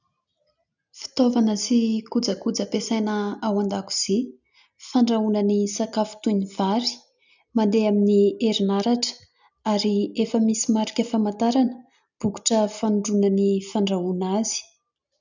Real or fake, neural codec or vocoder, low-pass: real; none; 7.2 kHz